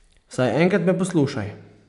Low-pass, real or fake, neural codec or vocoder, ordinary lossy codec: 10.8 kHz; real; none; none